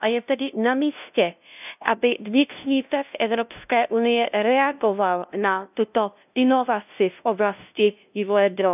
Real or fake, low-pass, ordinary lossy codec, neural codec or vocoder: fake; 3.6 kHz; none; codec, 16 kHz, 0.5 kbps, FunCodec, trained on LibriTTS, 25 frames a second